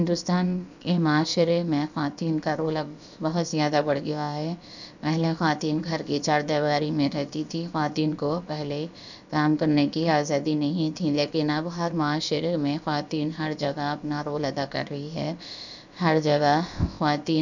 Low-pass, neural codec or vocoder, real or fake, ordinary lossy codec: 7.2 kHz; codec, 16 kHz, about 1 kbps, DyCAST, with the encoder's durations; fake; none